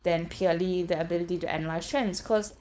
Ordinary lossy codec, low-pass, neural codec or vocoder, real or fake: none; none; codec, 16 kHz, 4.8 kbps, FACodec; fake